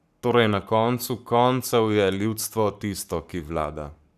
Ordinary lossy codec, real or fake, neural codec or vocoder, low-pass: none; fake; codec, 44.1 kHz, 7.8 kbps, Pupu-Codec; 14.4 kHz